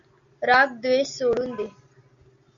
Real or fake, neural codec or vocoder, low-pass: real; none; 7.2 kHz